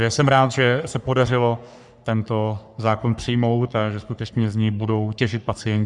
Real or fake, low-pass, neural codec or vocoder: fake; 10.8 kHz; codec, 44.1 kHz, 3.4 kbps, Pupu-Codec